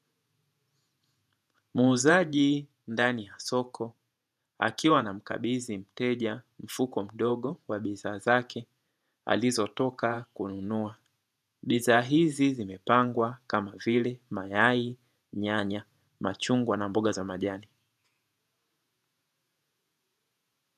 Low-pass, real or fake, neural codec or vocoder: 14.4 kHz; fake; vocoder, 48 kHz, 128 mel bands, Vocos